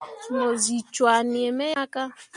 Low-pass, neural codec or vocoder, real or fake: 10.8 kHz; none; real